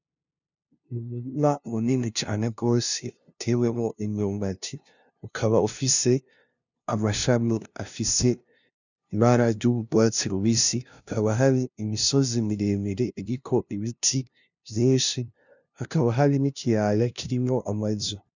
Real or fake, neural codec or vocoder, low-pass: fake; codec, 16 kHz, 0.5 kbps, FunCodec, trained on LibriTTS, 25 frames a second; 7.2 kHz